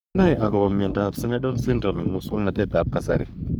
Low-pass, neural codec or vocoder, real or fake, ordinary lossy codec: none; codec, 44.1 kHz, 3.4 kbps, Pupu-Codec; fake; none